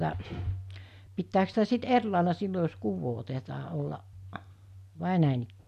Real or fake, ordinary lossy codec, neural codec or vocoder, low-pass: real; none; none; 14.4 kHz